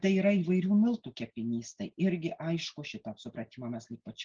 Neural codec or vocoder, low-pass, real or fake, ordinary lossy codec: none; 7.2 kHz; real; Opus, 16 kbps